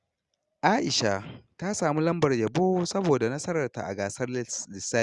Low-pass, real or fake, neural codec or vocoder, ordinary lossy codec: 10.8 kHz; real; none; Opus, 64 kbps